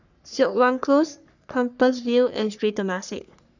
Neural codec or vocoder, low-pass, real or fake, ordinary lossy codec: codec, 44.1 kHz, 3.4 kbps, Pupu-Codec; 7.2 kHz; fake; none